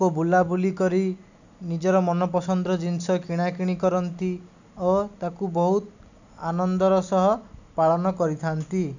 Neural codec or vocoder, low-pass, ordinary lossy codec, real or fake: none; 7.2 kHz; none; real